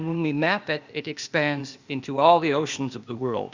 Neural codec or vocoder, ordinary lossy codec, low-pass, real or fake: codec, 16 kHz, 0.8 kbps, ZipCodec; Opus, 64 kbps; 7.2 kHz; fake